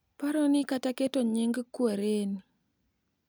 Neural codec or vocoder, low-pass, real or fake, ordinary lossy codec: none; none; real; none